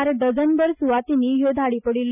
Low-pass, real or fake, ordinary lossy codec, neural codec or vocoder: 3.6 kHz; real; none; none